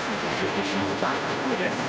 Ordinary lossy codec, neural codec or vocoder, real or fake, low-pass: none; codec, 16 kHz, 0.5 kbps, FunCodec, trained on Chinese and English, 25 frames a second; fake; none